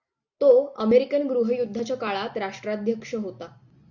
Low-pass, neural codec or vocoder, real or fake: 7.2 kHz; none; real